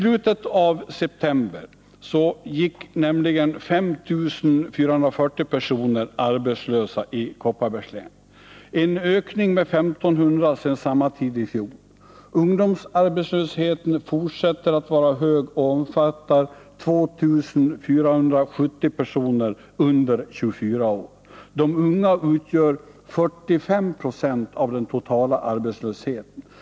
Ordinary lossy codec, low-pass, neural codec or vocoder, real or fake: none; none; none; real